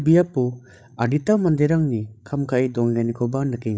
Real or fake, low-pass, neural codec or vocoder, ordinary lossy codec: fake; none; codec, 16 kHz, 16 kbps, FreqCodec, larger model; none